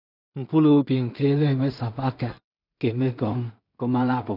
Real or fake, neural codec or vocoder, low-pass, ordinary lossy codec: fake; codec, 16 kHz in and 24 kHz out, 0.4 kbps, LongCat-Audio-Codec, two codebook decoder; 5.4 kHz; none